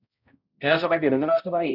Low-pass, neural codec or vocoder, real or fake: 5.4 kHz; codec, 16 kHz, 0.5 kbps, X-Codec, HuBERT features, trained on balanced general audio; fake